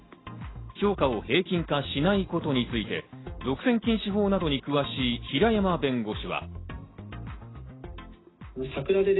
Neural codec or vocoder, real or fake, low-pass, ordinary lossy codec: none; real; 7.2 kHz; AAC, 16 kbps